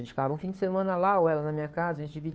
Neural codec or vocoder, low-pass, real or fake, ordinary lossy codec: codec, 16 kHz, 2 kbps, FunCodec, trained on Chinese and English, 25 frames a second; none; fake; none